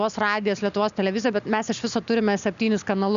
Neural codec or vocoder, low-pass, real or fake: none; 7.2 kHz; real